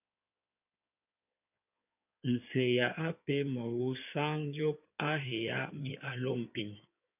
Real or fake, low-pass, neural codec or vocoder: fake; 3.6 kHz; codec, 16 kHz in and 24 kHz out, 2.2 kbps, FireRedTTS-2 codec